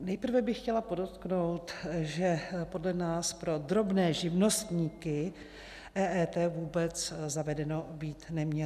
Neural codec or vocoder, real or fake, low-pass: none; real; 14.4 kHz